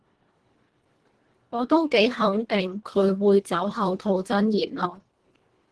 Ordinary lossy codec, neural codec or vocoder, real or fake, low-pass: Opus, 16 kbps; codec, 24 kHz, 1.5 kbps, HILCodec; fake; 10.8 kHz